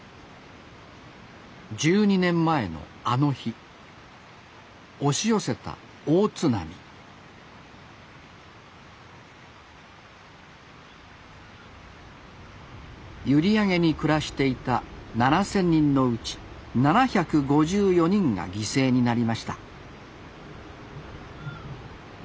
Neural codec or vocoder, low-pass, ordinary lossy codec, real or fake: none; none; none; real